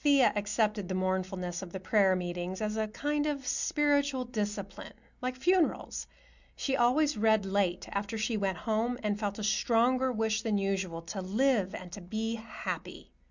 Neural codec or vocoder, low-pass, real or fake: none; 7.2 kHz; real